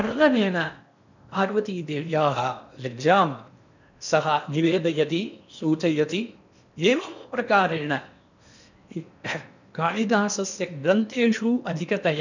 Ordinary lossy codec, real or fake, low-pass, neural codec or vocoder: none; fake; 7.2 kHz; codec, 16 kHz in and 24 kHz out, 0.8 kbps, FocalCodec, streaming, 65536 codes